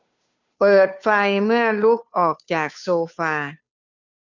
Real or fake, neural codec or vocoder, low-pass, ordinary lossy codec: fake; codec, 16 kHz, 2 kbps, FunCodec, trained on Chinese and English, 25 frames a second; 7.2 kHz; none